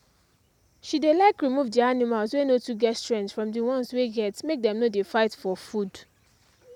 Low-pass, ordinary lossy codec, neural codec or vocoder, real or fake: 19.8 kHz; none; none; real